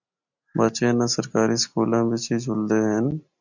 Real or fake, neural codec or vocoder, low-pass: real; none; 7.2 kHz